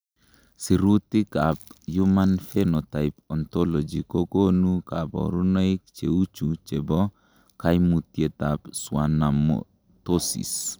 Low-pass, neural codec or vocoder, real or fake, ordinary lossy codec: none; none; real; none